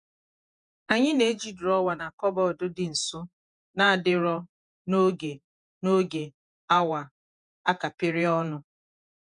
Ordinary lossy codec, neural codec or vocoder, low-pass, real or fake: none; vocoder, 48 kHz, 128 mel bands, Vocos; 10.8 kHz; fake